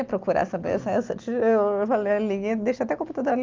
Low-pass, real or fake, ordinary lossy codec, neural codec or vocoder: 7.2 kHz; real; Opus, 24 kbps; none